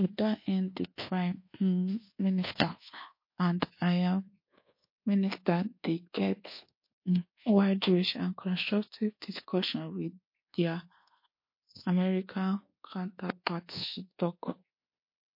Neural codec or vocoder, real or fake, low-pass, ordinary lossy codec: codec, 24 kHz, 1.2 kbps, DualCodec; fake; 5.4 kHz; MP3, 32 kbps